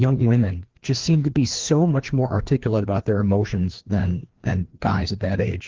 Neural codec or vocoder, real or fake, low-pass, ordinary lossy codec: codec, 16 kHz, 2 kbps, FreqCodec, larger model; fake; 7.2 kHz; Opus, 16 kbps